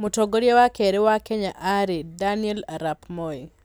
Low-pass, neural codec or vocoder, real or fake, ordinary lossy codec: none; none; real; none